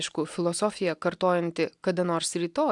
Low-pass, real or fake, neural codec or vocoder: 10.8 kHz; real; none